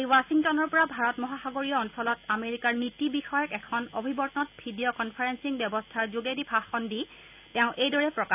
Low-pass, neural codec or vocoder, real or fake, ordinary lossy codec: 3.6 kHz; none; real; none